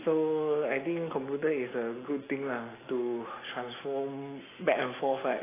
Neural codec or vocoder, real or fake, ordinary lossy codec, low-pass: none; real; AAC, 16 kbps; 3.6 kHz